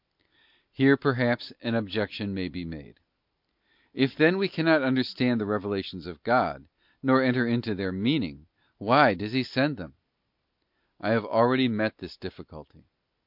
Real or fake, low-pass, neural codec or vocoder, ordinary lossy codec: real; 5.4 kHz; none; MP3, 48 kbps